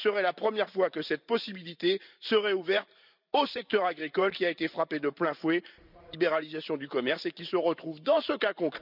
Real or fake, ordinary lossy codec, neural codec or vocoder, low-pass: fake; none; vocoder, 44.1 kHz, 128 mel bands every 512 samples, BigVGAN v2; 5.4 kHz